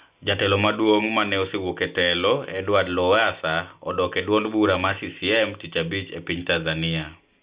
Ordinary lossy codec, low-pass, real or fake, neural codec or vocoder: Opus, 64 kbps; 3.6 kHz; real; none